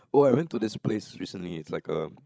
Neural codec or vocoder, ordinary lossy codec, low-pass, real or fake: codec, 16 kHz, 16 kbps, FreqCodec, larger model; none; none; fake